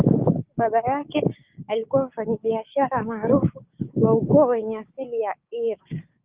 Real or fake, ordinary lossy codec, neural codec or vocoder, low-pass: fake; Opus, 32 kbps; codec, 16 kHz, 6 kbps, DAC; 3.6 kHz